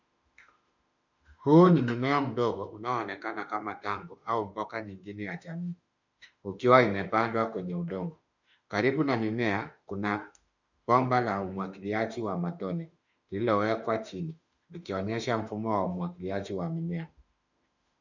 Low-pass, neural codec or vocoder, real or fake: 7.2 kHz; autoencoder, 48 kHz, 32 numbers a frame, DAC-VAE, trained on Japanese speech; fake